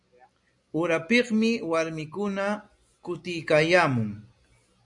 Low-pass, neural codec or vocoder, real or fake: 10.8 kHz; none; real